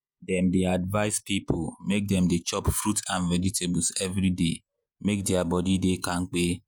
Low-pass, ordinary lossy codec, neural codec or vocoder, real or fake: none; none; vocoder, 48 kHz, 128 mel bands, Vocos; fake